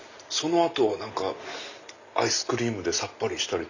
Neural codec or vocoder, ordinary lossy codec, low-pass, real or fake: none; Opus, 64 kbps; 7.2 kHz; real